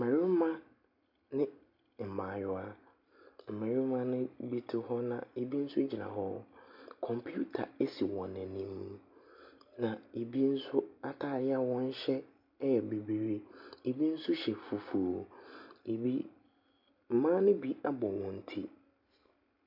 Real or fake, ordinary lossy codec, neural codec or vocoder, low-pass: real; MP3, 48 kbps; none; 5.4 kHz